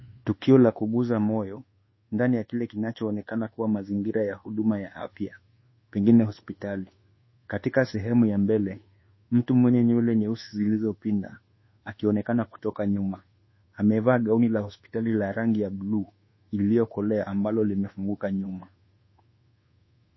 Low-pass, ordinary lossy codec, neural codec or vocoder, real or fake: 7.2 kHz; MP3, 24 kbps; codec, 24 kHz, 1.2 kbps, DualCodec; fake